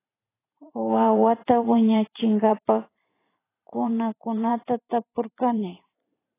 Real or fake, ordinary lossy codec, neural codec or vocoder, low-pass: fake; AAC, 16 kbps; vocoder, 44.1 kHz, 128 mel bands every 512 samples, BigVGAN v2; 3.6 kHz